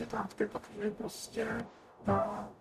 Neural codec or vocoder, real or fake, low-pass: codec, 44.1 kHz, 0.9 kbps, DAC; fake; 14.4 kHz